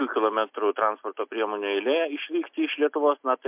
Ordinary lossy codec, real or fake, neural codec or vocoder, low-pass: MP3, 32 kbps; real; none; 3.6 kHz